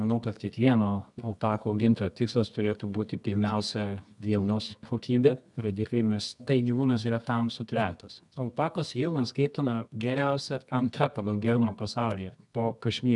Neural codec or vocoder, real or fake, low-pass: codec, 24 kHz, 0.9 kbps, WavTokenizer, medium music audio release; fake; 10.8 kHz